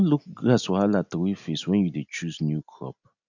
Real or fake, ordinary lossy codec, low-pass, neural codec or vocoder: real; none; 7.2 kHz; none